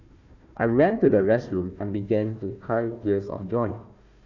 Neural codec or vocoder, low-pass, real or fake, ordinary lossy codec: codec, 16 kHz, 1 kbps, FunCodec, trained on Chinese and English, 50 frames a second; 7.2 kHz; fake; none